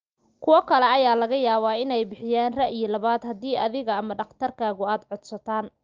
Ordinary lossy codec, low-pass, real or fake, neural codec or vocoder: Opus, 24 kbps; 7.2 kHz; real; none